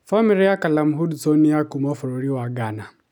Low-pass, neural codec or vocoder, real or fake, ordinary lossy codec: 19.8 kHz; none; real; none